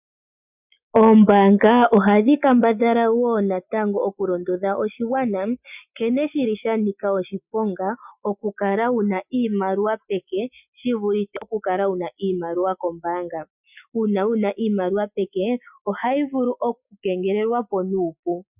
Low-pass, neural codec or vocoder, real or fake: 3.6 kHz; none; real